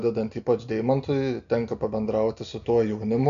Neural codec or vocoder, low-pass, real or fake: none; 7.2 kHz; real